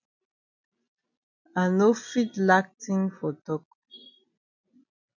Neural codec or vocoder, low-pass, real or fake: none; 7.2 kHz; real